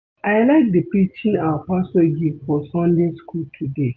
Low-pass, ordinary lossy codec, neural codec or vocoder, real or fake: none; none; none; real